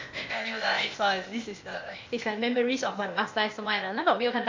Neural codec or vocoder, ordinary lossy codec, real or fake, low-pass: codec, 16 kHz, 0.8 kbps, ZipCodec; MP3, 48 kbps; fake; 7.2 kHz